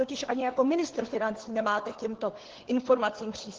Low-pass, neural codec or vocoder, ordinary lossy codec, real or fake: 7.2 kHz; codec, 16 kHz, 4 kbps, FunCodec, trained on LibriTTS, 50 frames a second; Opus, 16 kbps; fake